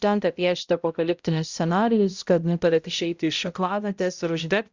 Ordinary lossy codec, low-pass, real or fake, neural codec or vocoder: Opus, 64 kbps; 7.2 kHz; fake; codec, 16 kHz, 0.5 kbps, X-Codec, HuBERT features, trained on balanced general audio